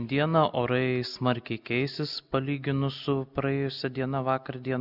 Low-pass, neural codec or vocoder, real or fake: 5.4 kHz; none; real